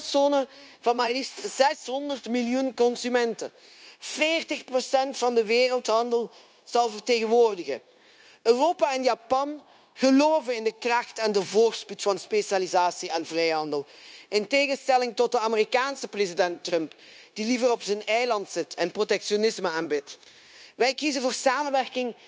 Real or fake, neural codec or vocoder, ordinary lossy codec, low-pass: fake; codec, 16 kHz, 0.9 kbps, LongCat-Audio-Codec; none; none